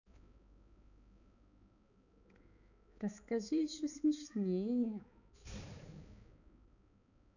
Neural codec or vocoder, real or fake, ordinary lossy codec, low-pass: codec, 16 kHz, 4 kbps, X-Codec, HuBERT features, trained on balanced general audio; fake; none; 7.2 kHz